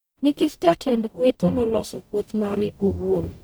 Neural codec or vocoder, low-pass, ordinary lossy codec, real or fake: codec, 44.1 kHz, 0.9 kbps, DAC; none; none; fake